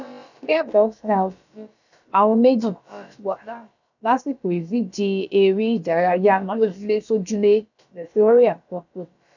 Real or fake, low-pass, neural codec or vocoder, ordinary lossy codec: fake; 7.2 kHz; codec, 16 kHz, about 1 kbps, DyCAST, with the encoder's durations; none